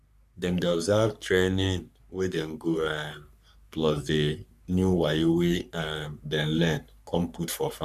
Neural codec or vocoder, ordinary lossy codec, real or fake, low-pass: codec, 44.1 kHz, 3.4 kbps, Pupu-Codec; none; fake; 14.4 kHz